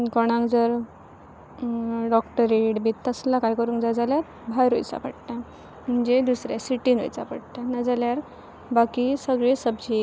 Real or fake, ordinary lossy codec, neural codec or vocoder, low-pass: real; none; none; none